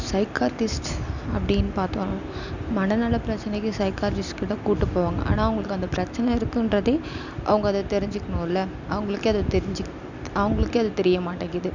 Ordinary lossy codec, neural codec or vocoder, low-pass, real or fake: none; none; 7.2 kHz; real